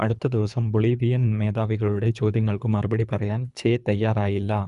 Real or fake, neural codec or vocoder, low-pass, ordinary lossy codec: fake; codec, 24 kHz, 3 kbps, HILCodec; 10.8 kHz; none